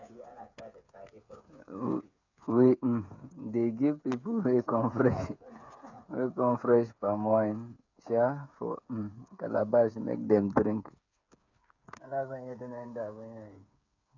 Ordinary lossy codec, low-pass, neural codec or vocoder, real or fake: none; 7.2 kHz; codec, 16 kHz, 8 kbps, FreqCodec, smaller model; fake